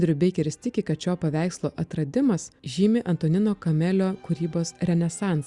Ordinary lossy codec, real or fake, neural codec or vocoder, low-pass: Opus, 64 kbps; real; none; 10.8 kHz